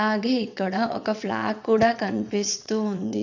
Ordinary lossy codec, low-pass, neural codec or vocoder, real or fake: none; 7.2 kHz; vocoder, 22.05 kHz, 80 mel bands, WaveNeXt; fake